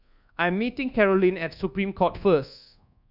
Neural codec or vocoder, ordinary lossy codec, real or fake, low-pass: codec, 24 kHz, 1.2 kbps, DualCodec; none; fake; 5.4 kHz